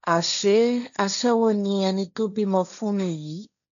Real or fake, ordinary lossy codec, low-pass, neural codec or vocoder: fake; MP3, 96 kbps; 7.2 kHz; codec, 16 kHz, 1.1 kbps, Voila-Tokenizer